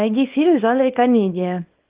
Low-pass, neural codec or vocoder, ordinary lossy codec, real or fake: 3.6 kHz; codec, 24 kHz, 0.9 kbps, WavTokenizer, small release; Opus, 32 kbps; fake